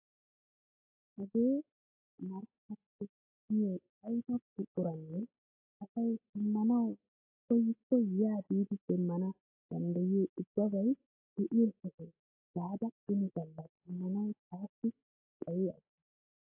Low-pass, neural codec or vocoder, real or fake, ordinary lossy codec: 3.6 kHz; none; real; AAC, 32 kbps